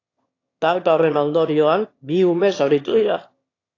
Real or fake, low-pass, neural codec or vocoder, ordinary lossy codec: fake; 7.2 kHz; autoencoder, 22.05 kHz, a latent of 192 numbers a frame, VITS, trained on one speaker; AAC, 32 kbps